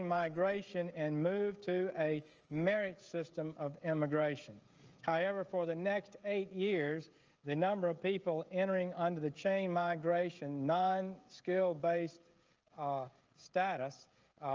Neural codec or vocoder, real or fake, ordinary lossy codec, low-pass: codec, 16 kHz, 16 kbps, FreqCodec, smaller model; fake; Opus, 24 kbps; 7.2 kHz